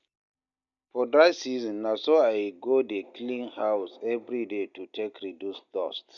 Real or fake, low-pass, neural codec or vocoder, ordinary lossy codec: real; 7.2 kHz; none; none